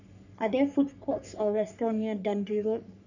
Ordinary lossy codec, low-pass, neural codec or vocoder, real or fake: none; 7.2 kHz; codec, 44.1 kHz, 3.4 kbps, Pupu-Codec; fake